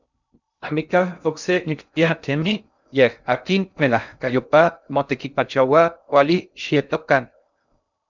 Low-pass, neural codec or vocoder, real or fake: 7.2 kHz; codec, 16 kHz in and 24 kHz out, 0.6 kbps, FocalCodec, streaming, 2048 codes; fake